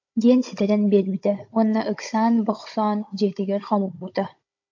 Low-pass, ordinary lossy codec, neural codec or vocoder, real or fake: 7.2 kHz; AAC, 48 kbps; codec, 16 kHz, 16 kbps, FunCodec, trained on Chinese and English, 50 frames a second; fake